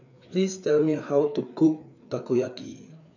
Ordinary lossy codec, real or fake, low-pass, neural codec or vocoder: none; fake; 7.2 kHz; codec, 16 kHz, 4 kbps, FreqCodec, larger model